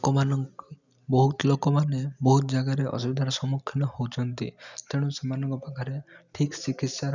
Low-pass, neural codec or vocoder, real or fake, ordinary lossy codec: 7.2 kHz; none; real; none